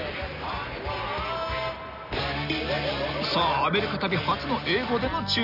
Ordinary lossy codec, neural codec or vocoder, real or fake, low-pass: none; none; real; 5.4 kHz